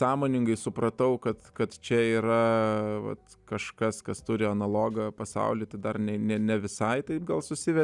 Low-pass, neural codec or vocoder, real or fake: 10.8 kHz; none; real